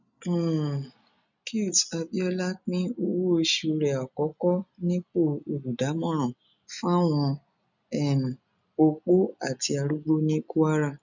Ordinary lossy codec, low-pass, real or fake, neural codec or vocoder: none; 7.2 kHz; real; none